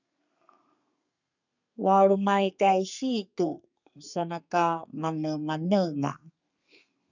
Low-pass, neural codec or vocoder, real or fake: 7.2 kHz; codec, 32 kHz, 1.9 kbps, SNAC; fake